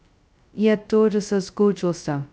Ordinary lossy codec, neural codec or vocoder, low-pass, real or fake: none; codec, 16 kHz, 0.2 kbps, FocalCodec; none; fake